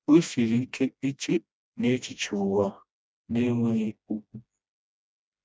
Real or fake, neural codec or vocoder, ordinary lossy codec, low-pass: fake; codec, 16 kHz, 1 kbps, FreqCodec, smaller model; none; none